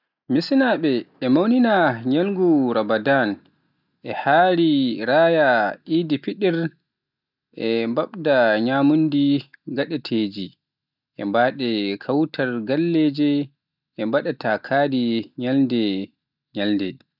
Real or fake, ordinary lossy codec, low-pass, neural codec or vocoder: real; none; 5.4 kHz; none